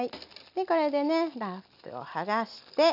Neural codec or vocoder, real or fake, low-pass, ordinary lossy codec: none; real; 5.4 kHz; none